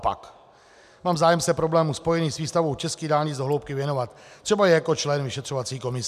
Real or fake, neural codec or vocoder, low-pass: real; none; 14.4 kHz